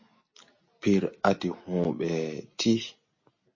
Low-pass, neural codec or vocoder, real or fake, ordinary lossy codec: 7.2 kHz; none; real; MP3, 32 kbps